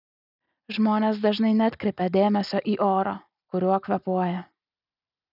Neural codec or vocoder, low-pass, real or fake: none; 5.4 kHz; real